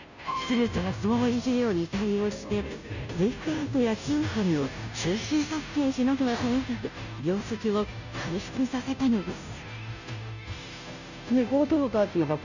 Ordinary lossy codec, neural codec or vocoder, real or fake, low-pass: none; codec, 16 kHz, 0.5 kbps, FunCodec, trained on Chinese and English, 25 frames a second; fake; 7.2 kHz